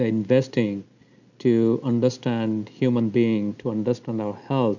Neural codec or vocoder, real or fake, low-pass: none; real; 7.2 kHz